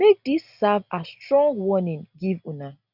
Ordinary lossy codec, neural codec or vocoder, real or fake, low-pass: none; none; real; 5.4 kHz